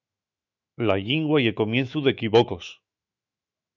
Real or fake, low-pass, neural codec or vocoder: fake; 7.2 kHz; autoencoder, 48 kHz, 128 numbers a frame, DAC-VAE, trained on Japanese speech